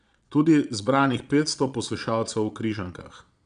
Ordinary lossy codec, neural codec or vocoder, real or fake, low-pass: none; vocoder, 22.05 kHz, 80 mel bands, Vocos; fake; 9.9 kHz